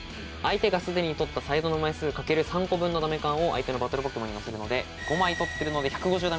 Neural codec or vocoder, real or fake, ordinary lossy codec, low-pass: none; real; none; none